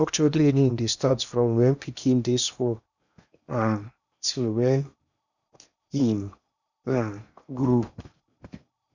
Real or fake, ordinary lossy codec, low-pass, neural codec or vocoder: fake; none; 7.2 kHz; codec, 16 kHz in and 24 kHz out, 0.8 kbps, FocalCodec, streaming, 65536 codes